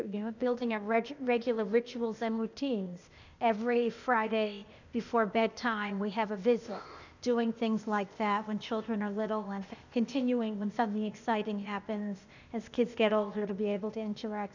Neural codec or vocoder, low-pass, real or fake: codec, 16 kHz, 0.8 kbps, ZipCodec; 7.2 kHz; fake